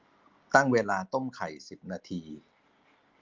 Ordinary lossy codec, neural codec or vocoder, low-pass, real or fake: Opus, 32 kbps; none; 7.2 kHz; real